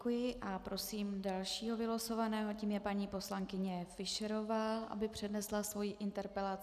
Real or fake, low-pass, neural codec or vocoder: real; 14.4 kHz; none